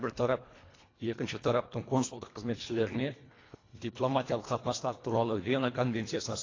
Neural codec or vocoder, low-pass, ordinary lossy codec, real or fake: codec, 24 kHz, 1.5 kbps, HILCodec; 7.2 kHz; AAC, 32 kbps; fake